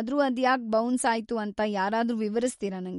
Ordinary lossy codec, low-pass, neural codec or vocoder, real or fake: MP3, 48 kbps; 19.8 kHz; none; real